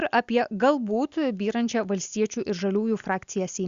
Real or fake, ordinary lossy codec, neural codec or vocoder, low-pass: real; Opus, 64 kbps; none; 7.2 kHz